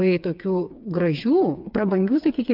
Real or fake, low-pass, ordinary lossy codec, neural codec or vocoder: fake; 5.4 kHz; MP3, 48 kbps; codec, 16 kHz, 4 kbps, FreqCodec, smaller model